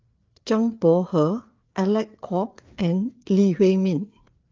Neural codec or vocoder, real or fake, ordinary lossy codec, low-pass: codec, 16 kHz, 4 kbps, FreqCodec, larger model; fake; Opus, 32 kbps; 7.2 kHz